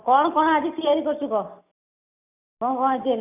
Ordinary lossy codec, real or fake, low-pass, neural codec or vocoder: none; real; 3.6 kHz; none